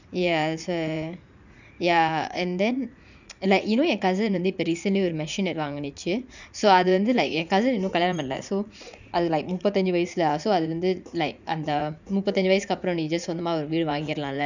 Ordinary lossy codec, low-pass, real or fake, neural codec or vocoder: none; 7.2 kHz; fake; vocoder, 44.1 kHz, 80 mel bands, Vocos